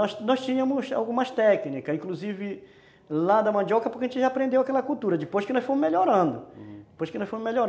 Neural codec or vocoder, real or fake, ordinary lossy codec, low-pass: none; real; none; none